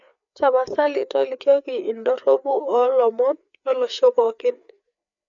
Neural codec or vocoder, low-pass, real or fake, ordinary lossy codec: codec, 16 kHz, 4 kbps, FreqCodec, larger model; 7.2 kHz; fake; none